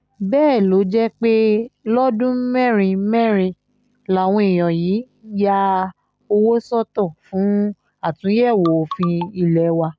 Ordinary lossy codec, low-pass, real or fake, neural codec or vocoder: none; none; real; none